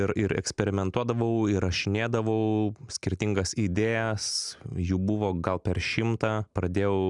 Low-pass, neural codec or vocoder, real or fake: 10.8 kHz; none; real